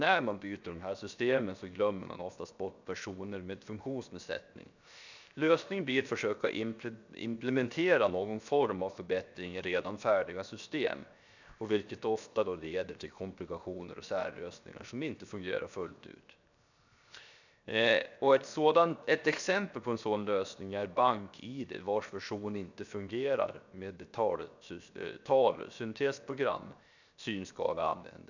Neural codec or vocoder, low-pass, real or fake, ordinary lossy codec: codec, 16 kHz, 0.7 kbps, FocalCodec; 7.2 kHz; fake; none